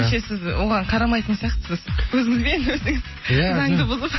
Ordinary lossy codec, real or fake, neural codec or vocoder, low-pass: MP3, 24 kbps; real; none; 7.2 kHz